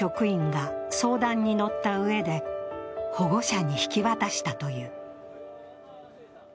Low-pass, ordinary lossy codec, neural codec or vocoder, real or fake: none; none; none; real